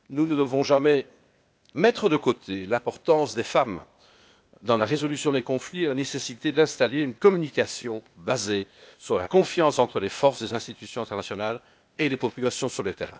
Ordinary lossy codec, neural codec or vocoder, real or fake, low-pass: none; codec, 16 kHz, 0.8 kbps, ZipCodec; fake; none